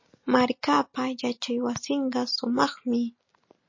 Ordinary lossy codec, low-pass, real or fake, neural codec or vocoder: MP3, 32 kbps; 7.2 kHz; real; none